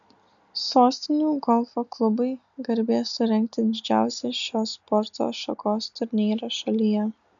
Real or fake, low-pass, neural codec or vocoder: real; 7.2 kHz; none